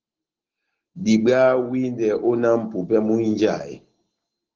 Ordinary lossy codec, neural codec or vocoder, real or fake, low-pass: Opus, 16 kbps; none; real; 7.2 kHz